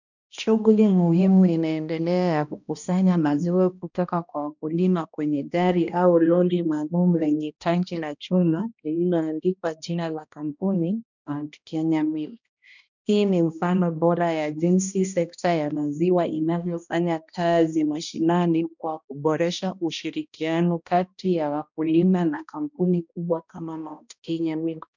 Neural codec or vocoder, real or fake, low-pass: codec, 16 kHz, 1 kbps, X-Codec, HuBERT features, trained on balanced general audio; fake; 7.2 kHz